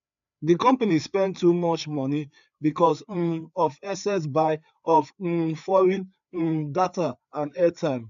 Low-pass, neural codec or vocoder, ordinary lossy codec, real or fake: 7.2 kHz; codec, 16 kHz, 4 kbps, FreqCodec, larger model; MP3, 96 kbps; fake